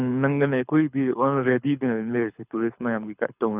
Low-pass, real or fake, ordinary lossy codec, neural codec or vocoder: 3.6 kHz; fake; none; codec, 24 kHz, 6 kbps, HILCodec